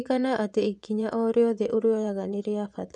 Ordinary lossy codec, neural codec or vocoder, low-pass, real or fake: none; vocoder, 24 kHz, 100 mel bands, Vocos; 10.8 kHz; fake